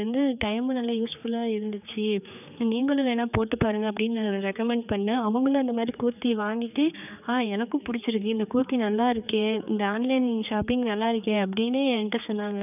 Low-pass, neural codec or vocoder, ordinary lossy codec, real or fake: 3.6 kHz; codec, 16 kHz, 4 kbps, X-Codec, HuBERT features, trained on general audio; none; fake